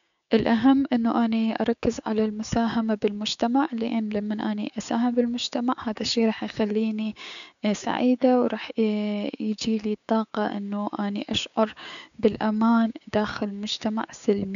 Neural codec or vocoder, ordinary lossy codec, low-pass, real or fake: codec, 16 kHz, 6 kbps, DAC; none; 7.2 kHz; fake